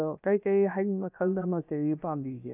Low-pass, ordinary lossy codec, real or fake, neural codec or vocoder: 3.6 kHz; none; fake; codec, 16 kHz, about 1 kbps, DyCAST, with the encoder's durations